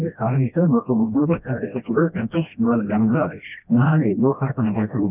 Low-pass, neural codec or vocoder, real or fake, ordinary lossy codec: 3.6 kHz; codec, 16 kHz, 1 kbps, FreqCodec, smaller model; fake; none